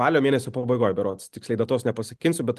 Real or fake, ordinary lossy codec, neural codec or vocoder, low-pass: real; Opus, 32 kbps; none; 14.4 kHz